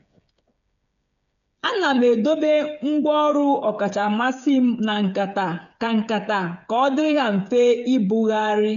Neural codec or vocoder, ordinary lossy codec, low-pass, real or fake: codec, 16 kHz, 8 kbps, FreqCodec, smaller model; none; 7.2 kHz; fake